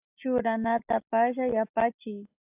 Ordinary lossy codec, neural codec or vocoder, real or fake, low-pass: AAC, 24 kbps; none; real; 3.6 kHz